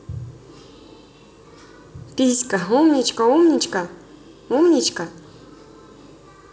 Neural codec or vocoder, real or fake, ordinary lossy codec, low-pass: none; real; none; none